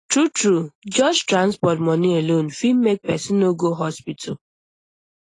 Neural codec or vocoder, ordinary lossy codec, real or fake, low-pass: none; AAC, 32 kbps; real; 10.8 kHz